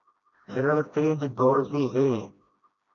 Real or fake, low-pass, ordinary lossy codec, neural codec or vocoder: fake; 7.2 kHz; MP3, 96 kbps; codec, 16 kHz, 1 kbps, FreqCodec, smaller model